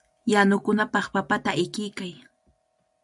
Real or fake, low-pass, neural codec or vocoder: real; 10.8 kHz; none